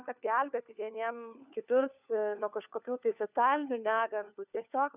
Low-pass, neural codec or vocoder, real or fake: 3.6 kHz; codec, 16 kHz, 4 kbps, FunCodec, trained on LibriTTS, 50 frames a second; fake